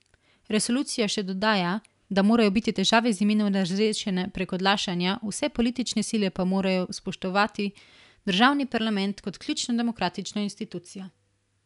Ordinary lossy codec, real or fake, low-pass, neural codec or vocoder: none; real; 10.8 kHz; none